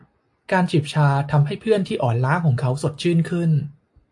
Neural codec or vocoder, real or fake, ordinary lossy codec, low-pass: none; real; AAC, 64 kbps; 10.8 kHz